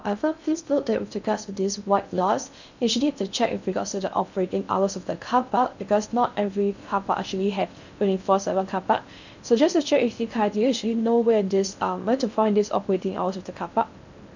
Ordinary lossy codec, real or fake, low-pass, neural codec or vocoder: none; fake; 7.2 kHz; codec, 16 kHz in and 24 kHz out, 0.6 kbps, FocalCodec, streaming, 2048 codes